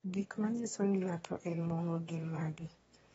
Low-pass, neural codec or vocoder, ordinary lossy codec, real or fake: 9.9 kHz; autoencoder, 22.05 kHz, a latent of 192 numbers a frame, VITS, trained on one speaker; AAC, 24 kbps; fake